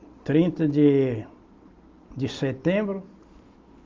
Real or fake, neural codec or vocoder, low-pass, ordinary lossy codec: real; none; 7.2 kHz; Opus, 32 kbps